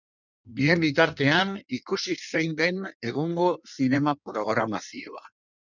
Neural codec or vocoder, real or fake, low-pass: codec, 16 kHz in and 24 kHz out, 1.1 kbps, FireRedTTS-2 codec; fake; 7.2 kHz